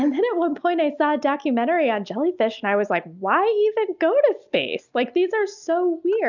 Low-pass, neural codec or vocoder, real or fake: 7.2 kHz; none; real